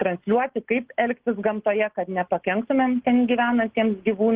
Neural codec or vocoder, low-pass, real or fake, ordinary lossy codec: none; 3.6 kHz; real; Opus, 16 kbps